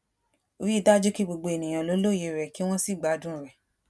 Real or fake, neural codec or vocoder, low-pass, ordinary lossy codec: real; none; none; none